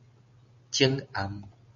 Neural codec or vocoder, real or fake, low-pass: none; real; 7.2 kHz